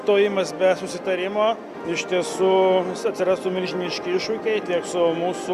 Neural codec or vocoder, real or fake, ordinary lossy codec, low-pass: none; real; Opus, 64 kbps; 14.4 kHz